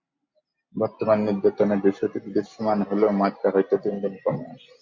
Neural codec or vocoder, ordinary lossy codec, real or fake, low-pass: none; MP3, 48 kbps; real; 7.2 kHz